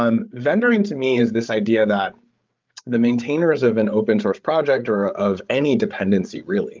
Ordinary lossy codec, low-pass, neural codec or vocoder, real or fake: Opus, 24 kbps; 7.2 kHz; codec, 16 kHz, 8 kbps, FreqCodec, larger model; fake